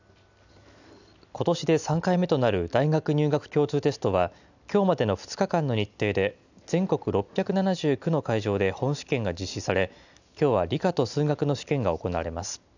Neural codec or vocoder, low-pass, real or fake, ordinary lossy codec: none; 7.2 kHz; real; none